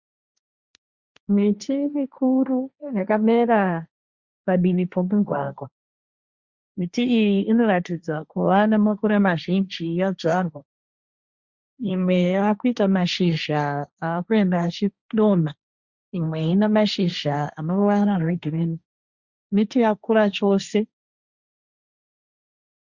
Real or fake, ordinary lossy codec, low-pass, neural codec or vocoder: fake; Opus, 64 kbps; 7.2 kHz; codec, 16 kHz, 1.1 kbps, Voila-Tokenizer